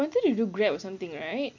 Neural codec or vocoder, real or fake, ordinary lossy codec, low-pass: none; real; none; 7.2 kHz